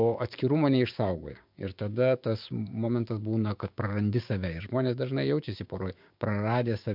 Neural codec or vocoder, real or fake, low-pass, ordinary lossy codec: codec, 16 kHz, 6 kbps, DAC; fake; 5.4 kHz; MP3, 48 kbps